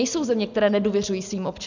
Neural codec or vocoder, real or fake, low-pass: none; real; 7.2 kHz